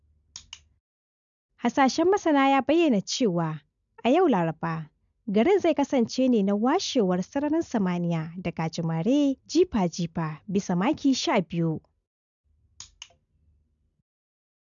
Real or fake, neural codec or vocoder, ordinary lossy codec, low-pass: real; none; none; 7.2 kHz